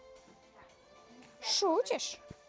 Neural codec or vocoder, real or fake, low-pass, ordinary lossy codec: none; real; none; none